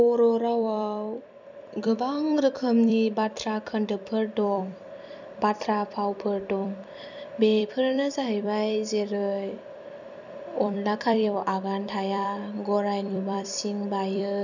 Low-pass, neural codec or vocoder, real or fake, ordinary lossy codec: 7.2 kHz; vocoder, 44.1 kHz, 128 mel bands every 256 samples, BigVGAN v2; fake; none